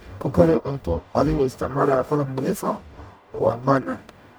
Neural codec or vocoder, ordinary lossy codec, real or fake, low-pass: codec, 44.1 kHz, 0.9 kbps, DAC; none; fake; none